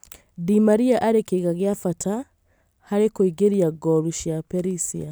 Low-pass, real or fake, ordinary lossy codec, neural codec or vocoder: none; real; none; none